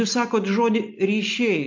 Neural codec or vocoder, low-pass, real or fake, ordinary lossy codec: none; 7.2 kHz; real; MP3, 48 kbps